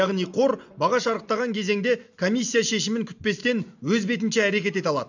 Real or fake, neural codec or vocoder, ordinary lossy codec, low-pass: real; none; none; 7.2 kHz